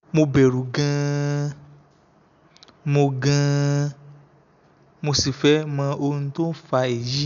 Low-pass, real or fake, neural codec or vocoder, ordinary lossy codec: 7.2 kHz; real; none; none